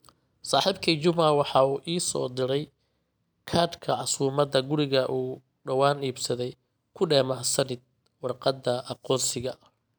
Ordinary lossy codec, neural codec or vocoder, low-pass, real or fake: none; none; none; real